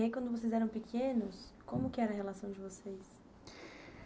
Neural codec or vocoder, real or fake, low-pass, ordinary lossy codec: none; real; none; none